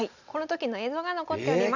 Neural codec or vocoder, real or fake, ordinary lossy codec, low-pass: none; real; none; 7.2 kHz